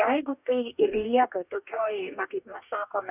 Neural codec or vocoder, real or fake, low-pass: codec, 44.1 kHz, 2.6 kbps, DAC; fake; 3.6 kHz